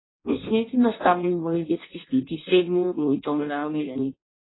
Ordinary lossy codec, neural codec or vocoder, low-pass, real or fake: AAC, 16 kbps; codec, 16 kHz in and 24 kHz out, 0.6 kbps, FireRedTTS-2 codec; 7.2 kHz; fake